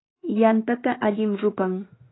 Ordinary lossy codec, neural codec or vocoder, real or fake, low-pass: AAC, 16 kbps; autoencoder, 48 kHz, 32 numbers a frame, DAC-VAE, trained on Japanese speech; fake; 7.2 kHz